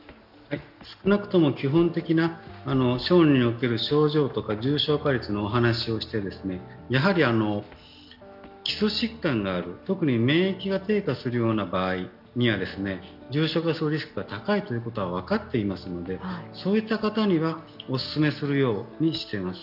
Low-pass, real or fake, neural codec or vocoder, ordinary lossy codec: 5.4 kHz; real; none; none